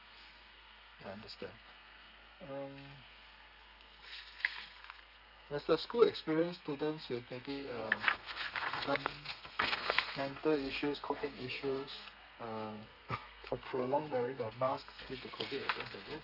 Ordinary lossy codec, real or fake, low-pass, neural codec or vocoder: none; fake; 5.4 kHz; codec, 32 kHz, 1.9 kbps, SNAC